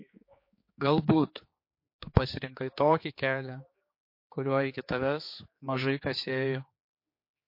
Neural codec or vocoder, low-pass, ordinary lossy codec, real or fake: codec, 16 kHz, 4 kbps, X-Codec, HuBERT features, trained on general audio; 5.4 kHz; MP3, 32 kbps; fake